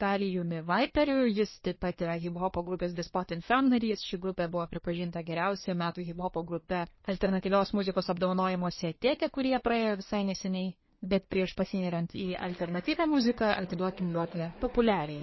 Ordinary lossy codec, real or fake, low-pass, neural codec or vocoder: MP3, 24 kbps; fake; 7.2 kHz; codec, 24 kHz, 1 kbps, SNAC